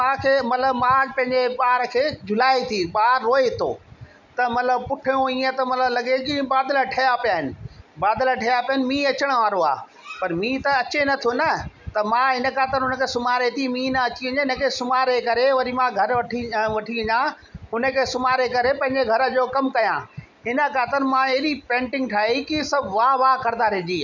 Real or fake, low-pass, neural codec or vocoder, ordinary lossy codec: real; 7.2 kHz; none; none